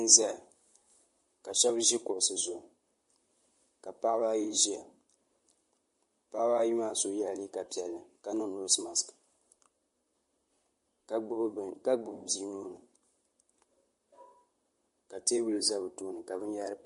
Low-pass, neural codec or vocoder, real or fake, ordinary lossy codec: 14.4 kHz; vocoder, 44.1 kHz, 128 mel bands, Pupu-Vocoder; fake; MP3, 48 kbps